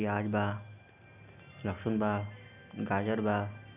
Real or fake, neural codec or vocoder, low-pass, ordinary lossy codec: real; none; 3.6 kHz; none